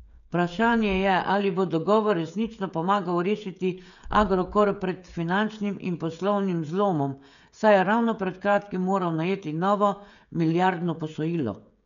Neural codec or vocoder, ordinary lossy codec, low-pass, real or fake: codec, 16 kHz, 16 kbps, FreqCodec, smaller model; none; 7.2 kHz; fake